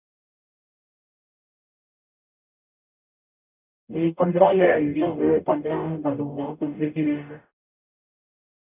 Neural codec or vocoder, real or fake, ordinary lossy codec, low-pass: codec, 44.1 kHz, 0.9 kbps, DAC; fake; none; 3.6 kHz